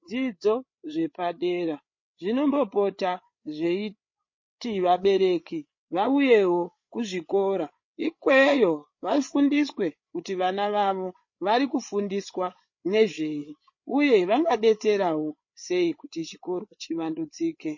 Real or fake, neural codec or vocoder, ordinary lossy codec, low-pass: fake; codec, 16 kHz, 8 kbps, FreqCodec, larger model; MP3, 32 kbps; 7.2 kHz